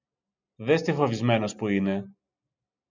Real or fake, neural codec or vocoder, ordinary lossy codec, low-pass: real; none; MP3, 64 kbps; 7.2 kHz